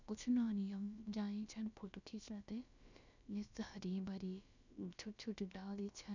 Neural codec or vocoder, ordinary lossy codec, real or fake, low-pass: codec, 16 kHz, about 1 kbps, DyCAST, with the encoder's durations; none; fake; 7.2 kHz